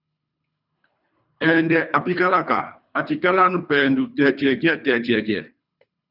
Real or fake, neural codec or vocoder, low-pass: fake; codec, 24 kHz, 3 kbps, HILCodec; 5.4 kHz